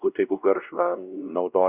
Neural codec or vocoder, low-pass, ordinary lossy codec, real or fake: codec, 16 kHz, 1 kbps, X-Codec, WavLM features, trained on Multilingual LibriSpeech; 3.6 kHz; Opus, 64 kbps; fake